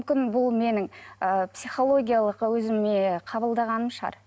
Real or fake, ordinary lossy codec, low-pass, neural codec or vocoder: real; none; none; none